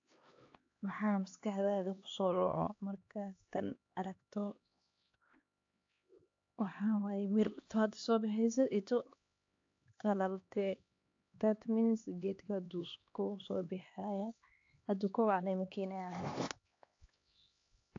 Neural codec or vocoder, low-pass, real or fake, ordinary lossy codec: codec, 16 kHz, 2 kbps, X-Codec, HuBERT features, trained on LibriSpeech; 7.2 kHz; fake; AAC, 48 kbps